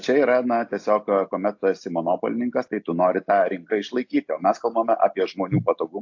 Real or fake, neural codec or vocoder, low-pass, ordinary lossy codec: real; none; 7.2 kHz; AAC, 48 kbps